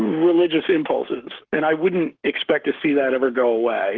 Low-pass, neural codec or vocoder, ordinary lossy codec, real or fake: 7.2 kHz; codec, 44.1 kHz, 7.8 kbps, Pupu-Codec; Opus, 16 kbps; fake